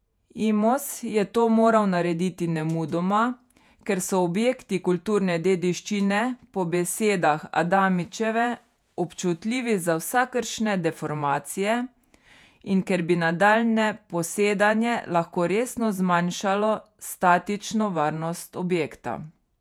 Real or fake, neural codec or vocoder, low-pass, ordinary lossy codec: fake; vocoder, 48 kHz, 128 mel bands, Vocos; 19.8 kHz; none